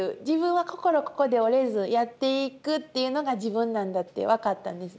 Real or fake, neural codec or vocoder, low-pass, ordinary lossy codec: real; none; none; none